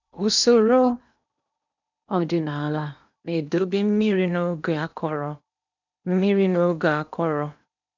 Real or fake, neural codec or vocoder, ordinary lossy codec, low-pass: fake; codec, 16 kHz in and 24 kHz out, 0.8 kbps, FocalCodec, streaming, 65536 codes; none; 7.2 kHz